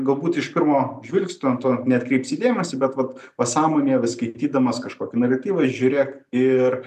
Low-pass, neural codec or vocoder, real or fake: 14.4 kHz; none; real